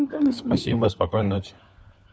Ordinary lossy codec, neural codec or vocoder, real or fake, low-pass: none; codec, 16 kHz, 4 kbps, FunCodec, trained on LibriTTS, 50 frames a second; fake; none